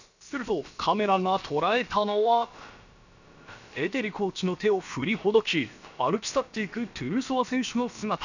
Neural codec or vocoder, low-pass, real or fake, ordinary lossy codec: codec, 16 kHz, about 1 kbps, DyCAST, with the encoder's durations; 7.2 kHz; fake; none